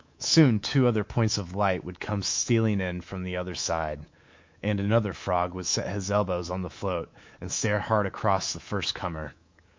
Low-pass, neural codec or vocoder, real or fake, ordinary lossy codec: 7.2 kHz; codec, 24 kHz, 3.1 kbps, DualCodec; fake; MP3, 48 kbps